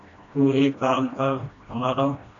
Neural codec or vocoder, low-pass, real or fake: codec, 16 kHz, 1 kbps, FreqCodec, smaller model; 7.2 kHz; fake